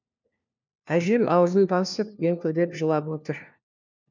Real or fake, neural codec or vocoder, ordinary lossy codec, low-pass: fake; codec, 16 kHz, 1 kbps, FunCodec, trained on LibriTTS, 50 frames a second; none; 7.2 kHz